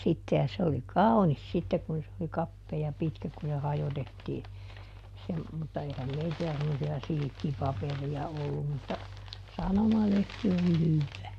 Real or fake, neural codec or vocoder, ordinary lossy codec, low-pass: real; none; MP3, 96 kbps; 14.4 kHz